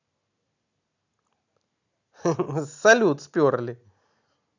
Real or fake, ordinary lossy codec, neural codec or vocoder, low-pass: real; none; none; 7.2 kHz